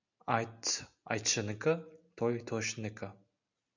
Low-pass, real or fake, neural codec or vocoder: 7.2 kHz; real; none